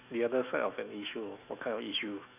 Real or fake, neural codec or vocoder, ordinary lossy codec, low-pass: fake; autoencoder, 48 kHz, 128 numbers a frame, DAC-VAE, trained on Japanese speech; none; 3.6 kHz